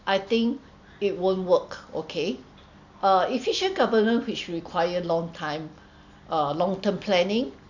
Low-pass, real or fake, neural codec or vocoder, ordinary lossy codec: 7.2 kHz; real; none; none